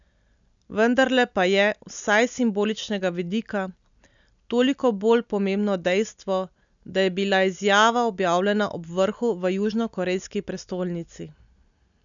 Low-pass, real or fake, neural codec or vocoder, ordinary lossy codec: 7.2 kHz; real; none; none